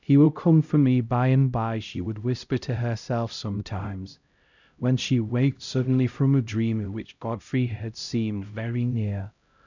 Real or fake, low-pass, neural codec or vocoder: fake; 7.2 kHz; codec, 16 kHz, 0.5 kbps, X-Codec, HuBERT features, trained on LibriSpeech